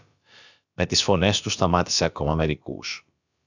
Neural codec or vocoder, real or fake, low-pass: codec, 16 kHz, about 1 kbps, DyCAST, with the encoder's durations; fake; 7.2 kHz